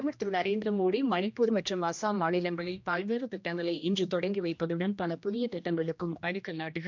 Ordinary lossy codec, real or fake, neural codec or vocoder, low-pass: MP3, 64 kbps; fake; codec, 16 kHz, 1 kbps, X-Codec, HuBERT features, trained on general audio; 7.2 kHz